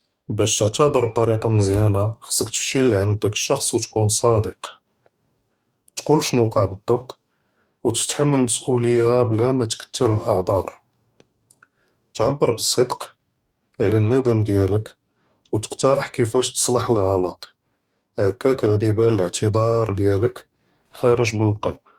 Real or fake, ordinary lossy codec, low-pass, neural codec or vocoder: fake; Opus, 64 kbps; 19.8 kHz; codec, 44.1 kHz, 2.6 kbps, DAC